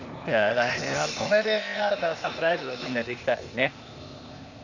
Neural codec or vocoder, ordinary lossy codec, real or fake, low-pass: codec, 16 kHz, 0.8 kbps, ZipCodec; Opus, 64 kbps; fake; 7.2 kHz